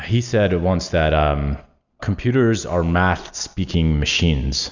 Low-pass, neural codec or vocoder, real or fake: 7.2 kHz; none; real